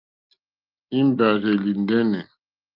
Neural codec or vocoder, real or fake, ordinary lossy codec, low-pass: none; real; Opus, 24 kbps; 5.4 kHz